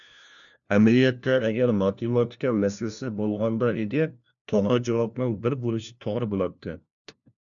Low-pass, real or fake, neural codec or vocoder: 7.2 kHz; fake; codec, 16 kHz, 1 kbps, FunCodec, trained on LibriTTS, 50 frames a second